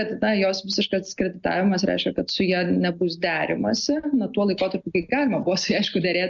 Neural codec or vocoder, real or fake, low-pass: none; real; 7.2 kHz